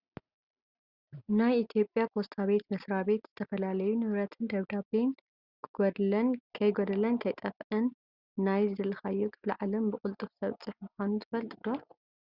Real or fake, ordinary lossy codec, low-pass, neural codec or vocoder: real; Opus, 64 kbps; 5.4 kHz; none